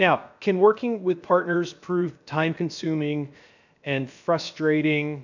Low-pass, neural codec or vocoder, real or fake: 7.2 kHz; codec, 16 kHz, 0.7 kbps, FocalCodec; fake